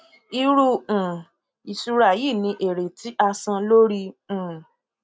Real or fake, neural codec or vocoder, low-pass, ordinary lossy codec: real; none; none; none